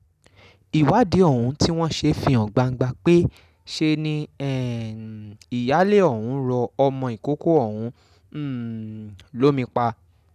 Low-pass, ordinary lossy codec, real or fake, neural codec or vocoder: 14.4 kHz; none; real; none